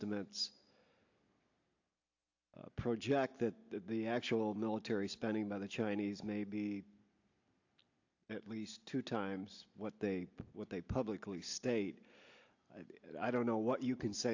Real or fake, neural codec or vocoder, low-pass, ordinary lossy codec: real; none; 7.2 kHz; AAC, 48 kbps